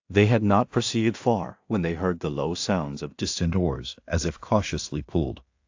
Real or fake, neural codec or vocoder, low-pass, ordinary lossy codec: fake; codec, 16 kHz in and 24 kHz out, 0.4 kbps, LongCat-Audio-Codec, two codebook decoder; 7.2 kHz; AAC, 48 kbps